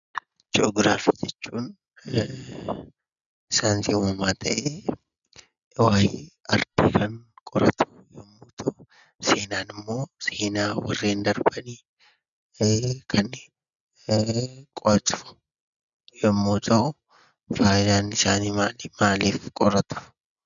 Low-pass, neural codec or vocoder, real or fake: 7.2 kHz; none; real